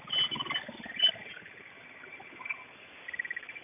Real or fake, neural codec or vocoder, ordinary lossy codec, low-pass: real; none; none; 3.6 kHz